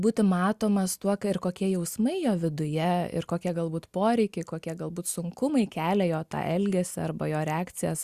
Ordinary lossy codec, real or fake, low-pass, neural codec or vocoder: Opus, 64 kbps; real; 14.4 kHz; none